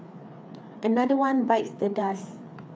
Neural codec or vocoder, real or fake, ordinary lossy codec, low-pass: codec, 16 kHz, 4 kbps, FreqCodec, larger model; fake; none; none